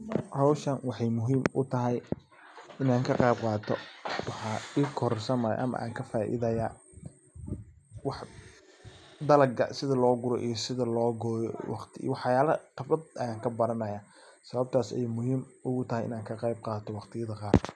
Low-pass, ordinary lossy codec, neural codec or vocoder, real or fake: none; none; none; real